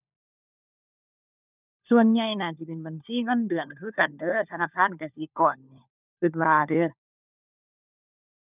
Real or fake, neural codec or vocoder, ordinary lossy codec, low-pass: fake; codec, 16 kHz, 4 kbps, FunCodec, trained on LibriTTS, 50 frames a second; none; 3.6 kHz